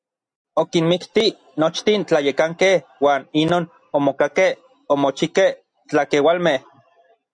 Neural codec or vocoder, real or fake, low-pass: none; real; 9.9 kHz